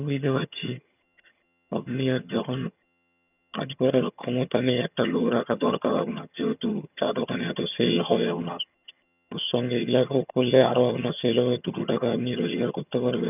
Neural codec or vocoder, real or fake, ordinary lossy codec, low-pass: vocoder, 22.05 kHz, 80 mel bands, HiFi-GAN; fake; none; 3.6 kHz